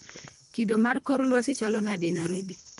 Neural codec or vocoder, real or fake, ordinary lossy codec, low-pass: codec, 24 kHz, 1.5 kbps, HILCodec; fake; MP3, 64 kbps; 10.8 kHz